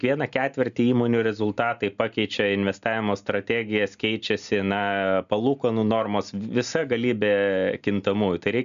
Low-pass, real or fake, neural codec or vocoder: 7.2 kHz; real; none